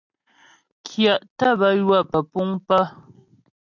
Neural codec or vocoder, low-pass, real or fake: none; 7.2 kHz; real